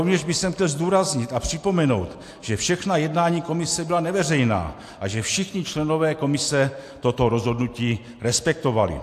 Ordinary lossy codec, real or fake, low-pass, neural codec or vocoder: AAC, 64 kbps; real; 14.4 kHz; none